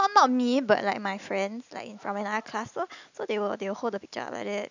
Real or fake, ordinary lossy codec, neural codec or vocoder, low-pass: real; none; none; 7.2 kHz